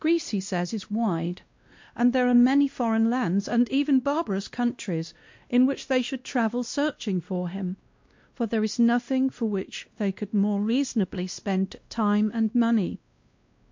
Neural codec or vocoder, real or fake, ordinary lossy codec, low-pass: codec, 16 kHz, 1 kbps, X-Codec, WavLM features, trained on Multilingual LibriSpeech; fake; MP3, 48 kbps; 7.2 kHz